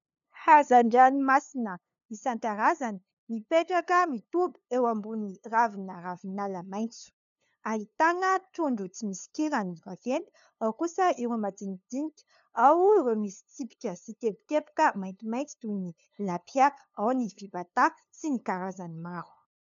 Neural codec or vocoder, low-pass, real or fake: codec, 16 kHz, 2 kbps, FunCodec, trained on LibriTTS, 25 frames a second; 7.2 kHz; fake